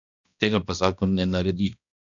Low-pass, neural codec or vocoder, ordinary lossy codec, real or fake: 7.2 kHz; codec, 16 kHz, 1 kbps, X-Codec, HuBERT features, trained on balanced general audio; none; fake